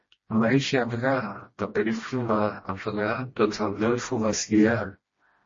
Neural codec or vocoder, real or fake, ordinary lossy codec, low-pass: codec, 16 kHz, 1 kbps, FreqCodec, smaller model; fake; MP3, 32 kbps; 7.2 kHz